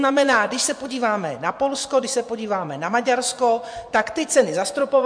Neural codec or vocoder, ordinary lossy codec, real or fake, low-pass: vocoder, 24 kHz, 100 mel bands, Vocos; MP3, 64 kbps; fake; 9.9 kHz